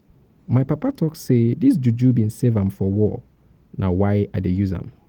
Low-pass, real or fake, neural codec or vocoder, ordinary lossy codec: 19.8 kHz; real; none; Opus, 24 kbps